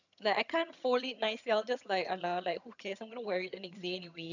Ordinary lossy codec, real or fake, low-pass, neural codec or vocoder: none; fake; 7.2 kHz; vocoder, 22.05 kHz, 80 mel bands, HiFi-GAN